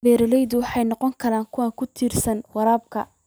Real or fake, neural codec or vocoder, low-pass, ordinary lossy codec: real; none; none; none